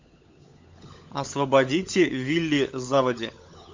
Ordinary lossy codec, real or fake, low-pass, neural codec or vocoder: MP3, 64 kbps; fake; 7.2 kHz; codec, 16 kHz, 16 kbps, FunCodec, trained on LibriTTS, 50 frames a second